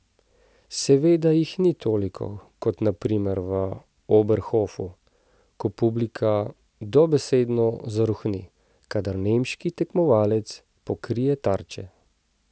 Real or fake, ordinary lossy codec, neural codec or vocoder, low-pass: real; none; none; none